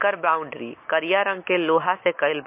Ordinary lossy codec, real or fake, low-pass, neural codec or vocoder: MP3, 24 kbps; real; 3.6 kHz; none